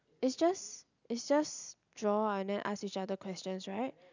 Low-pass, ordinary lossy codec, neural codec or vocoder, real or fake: 7.2 kHz; none; none; real